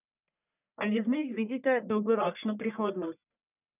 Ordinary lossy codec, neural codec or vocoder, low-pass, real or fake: none; codec, 44.1 kHz, 1.7 kbps, Pupu-Codec; 3.6 kHz; fake